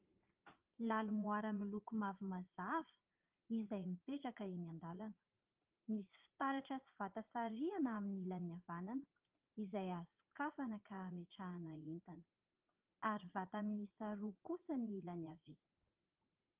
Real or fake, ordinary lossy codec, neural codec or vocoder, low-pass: fake; Opus, 32 kbps; vocoder, 44.1 kHz, 80 mel bands, Vocos; 3.6 kHz